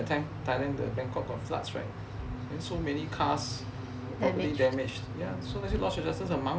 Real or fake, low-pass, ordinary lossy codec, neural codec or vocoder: real; none; none; none